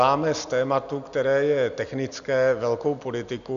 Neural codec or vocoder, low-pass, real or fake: none; 7.2 kHz; real